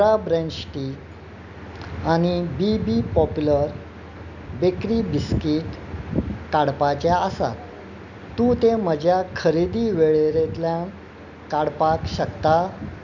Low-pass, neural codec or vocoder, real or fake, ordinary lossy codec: 7.2 kHz; none; real; none